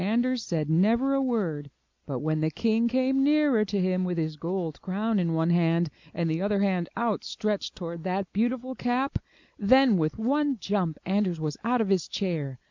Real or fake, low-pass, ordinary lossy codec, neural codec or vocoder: real; 7.2 kHz; MP3, 64 kbps; none